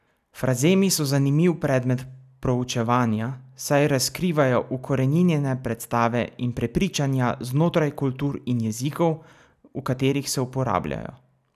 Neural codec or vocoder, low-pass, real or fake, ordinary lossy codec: none; 14.4 kHz; real; none